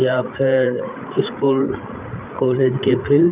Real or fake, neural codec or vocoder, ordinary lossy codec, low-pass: fake; codec, 16 kHz, 8 kbps, FreqCodec, larger model; Opus, 32 kbps; 3.6 kHz